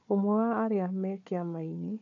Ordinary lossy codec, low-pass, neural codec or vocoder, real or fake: AAC, 48 kbps; 7.2 kHz; codec, 16 kHz, 4 kbps, FunCodec, trained on Chinese and English, 50 frames a second; fake